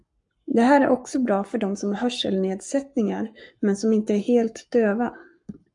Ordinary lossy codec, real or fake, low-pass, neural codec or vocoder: MP3, 96 kbps; fake; 10.8 kHz; codec, 44.1 kHz, 7.8 kbps, Pupu-Codec